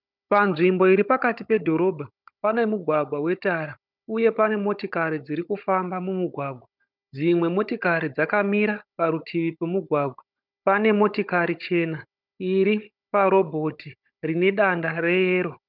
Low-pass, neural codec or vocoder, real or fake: 5.4 kHz; codec, 16 kHz, 16 kbps, FunCodec, trained on Chinese and English, 50 frames a second; fake